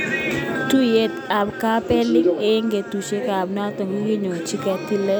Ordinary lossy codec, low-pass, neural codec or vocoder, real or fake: none; none; none; real